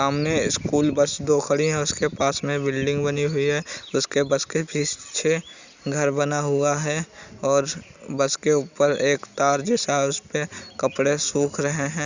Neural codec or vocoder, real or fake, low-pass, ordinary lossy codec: none; real; 7.2 kHz; Opus, 64 kbps